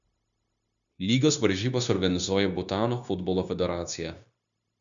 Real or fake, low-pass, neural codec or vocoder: fake; 7.2 kHz; codec, 16 kHz, 0.9 kbps, LongCat-Audio-Codec